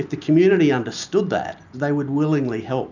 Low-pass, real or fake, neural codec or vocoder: 7.2 kHz; real; none